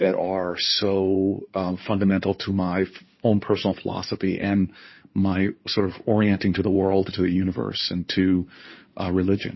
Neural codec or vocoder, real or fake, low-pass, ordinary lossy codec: codec, 16 kHz in and 24 kHz out, 2.2 kbps, FireRedTTS-2 codec; fake; 7.2 kHz; MP3, 24 kbps